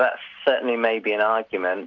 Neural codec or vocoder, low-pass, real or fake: none; 7.2 kHz; real